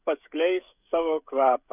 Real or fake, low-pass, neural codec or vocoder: fake; 3.6 kHz; codec, 16 kHz, 16 kbps, FreqCodec, smaller model